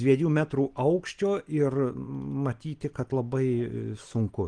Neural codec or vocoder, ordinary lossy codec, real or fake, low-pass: none; Opus, 24 kbps; real; 9.9 kHz